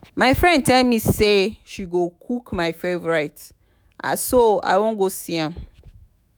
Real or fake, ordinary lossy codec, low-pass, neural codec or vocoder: fake; none; none; autoencoder, 48 kHz, 128 numbers a frame, DAC-VAE, trained on Japanese speech